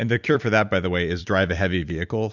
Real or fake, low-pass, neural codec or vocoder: fake; 7.2 kHz; vocoder, 44.1 kHz, 128 mel bands every 256 samples, BigVGAN v2